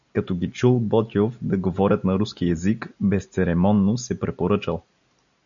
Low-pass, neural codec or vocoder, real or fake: 7.2 kHz; none; real